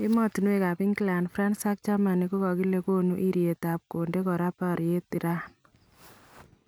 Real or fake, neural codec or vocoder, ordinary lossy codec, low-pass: real; none; none; none